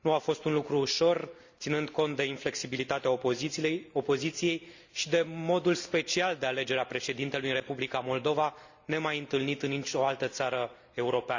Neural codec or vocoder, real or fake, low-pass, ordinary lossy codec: none; real; 7.2 kHz; Opus, 64 kbps